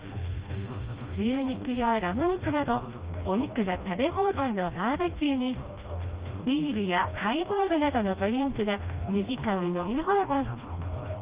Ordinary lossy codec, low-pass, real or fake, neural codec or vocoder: Opus, 24 kbps; 3.6 kHz; fake; codec, 16 kHz, 1 kbps, FreqCodec, smaller model